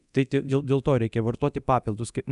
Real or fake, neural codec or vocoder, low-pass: fake; codec, 24 kHz, 0.9 kbps, DualCodec; 10.8 kHz